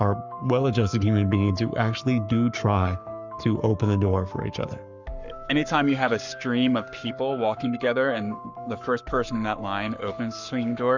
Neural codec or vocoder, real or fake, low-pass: codec, 44.1 kHz, 7.8 kbps, Pupu-Codec; fake; 7.2 kHz